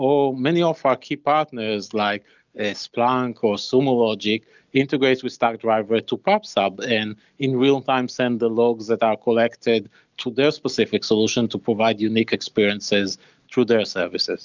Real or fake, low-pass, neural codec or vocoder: real; 7.2 kHz; none